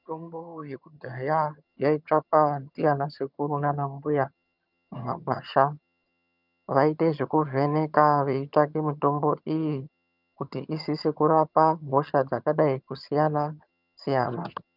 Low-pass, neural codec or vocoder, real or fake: 5.4 kHz; vocoder, 22.05 kHz, 80 mel bands, HiFi-GAN; fake